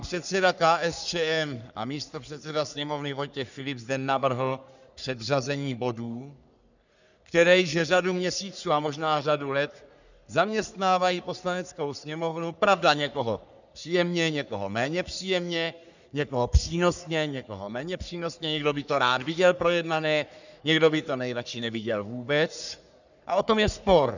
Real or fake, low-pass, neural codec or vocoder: fake; 7.2 kHz; codec, 44.1 kHz, 3.4 kbps, Pupu-Codec